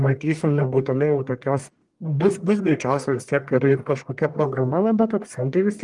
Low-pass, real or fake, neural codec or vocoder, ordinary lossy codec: 10.8 kHz; fake; codec, 44.1 kHz, 1.7 kbps, Pupu-Codec; Opus, 24 kbps